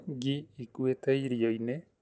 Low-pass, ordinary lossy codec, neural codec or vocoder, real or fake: none; none; none; real